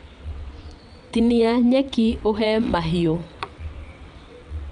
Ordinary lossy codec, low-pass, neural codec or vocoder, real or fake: none; 9.9 kHz; vocoder, 22.05 kHz, 80 mel bands, WaveNeXt; fake